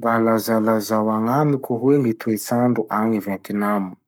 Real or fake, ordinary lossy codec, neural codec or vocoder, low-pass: fake; none; codec, 44.1 kHz, 7.8 kbps, Pupu-Codec; none